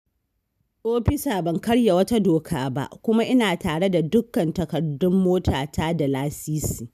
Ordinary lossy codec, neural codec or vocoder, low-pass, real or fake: none; none; 14.4 kHz; real